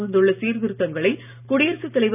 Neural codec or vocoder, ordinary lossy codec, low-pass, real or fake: none; none; 3.6 kHz; real